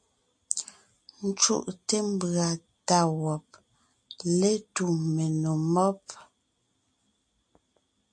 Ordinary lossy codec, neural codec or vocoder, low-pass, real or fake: MP3, 64 kbps; none; 9.9 kHz; real